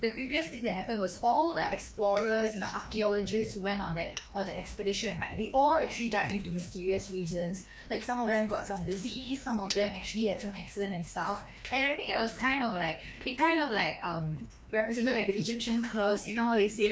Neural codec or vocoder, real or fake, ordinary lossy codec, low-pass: codec, 16 kHz, 1 kbps, FreqCodec, larger model; fake; none; none